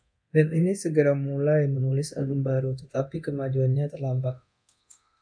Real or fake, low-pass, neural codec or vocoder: fake; 9.9 kHz; codec, 24 kHz, 0.9 kbps, DualCodec